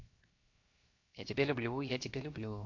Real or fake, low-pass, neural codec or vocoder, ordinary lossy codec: fake; 7.2 kHz; codec, 16 kHz, 0.7 kbps, FocalCodec; MP3, 48 kbps